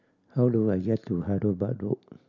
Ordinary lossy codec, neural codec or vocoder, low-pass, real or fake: none; none; 7.2 kHz; real